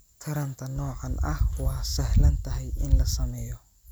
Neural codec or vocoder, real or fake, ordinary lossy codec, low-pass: none; real; none; none